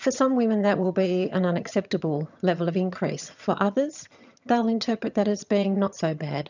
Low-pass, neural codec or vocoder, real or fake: 7.2 kHz; vocoder, 22.05 kHz, 80 mel bands, HiFi-GAN; fake